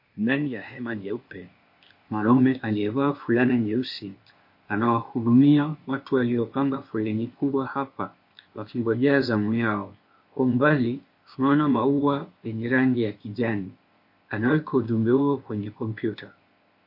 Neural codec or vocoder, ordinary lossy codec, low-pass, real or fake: codec, 16 kHz, 0.8 kbps, ZipCodec; MP3, 32 kbps; 5.4 kHz; fake